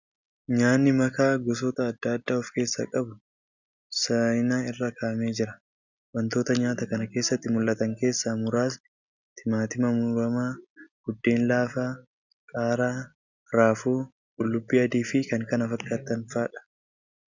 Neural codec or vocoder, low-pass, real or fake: none; 7.2 kHz; real